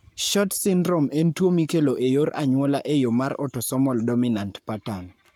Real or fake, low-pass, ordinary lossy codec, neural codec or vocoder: fake; none; none; codec, 44.1 kHz, 7.8 kbps, Pupu-Codec